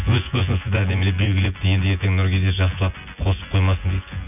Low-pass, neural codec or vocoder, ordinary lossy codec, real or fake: 3.6 kHz; vocoder, 24 kHz, 100 mel bands, Vocos; none; fake